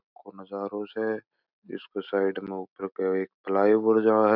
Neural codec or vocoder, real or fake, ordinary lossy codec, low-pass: none; real; none; 5.4 kHz